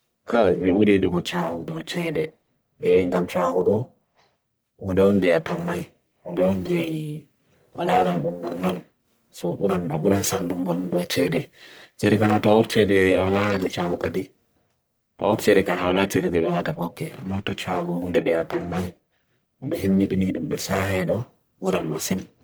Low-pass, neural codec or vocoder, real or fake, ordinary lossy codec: none; codec, 44.1 kHz, 1.7 kbps, Pupu-Codec; fake; none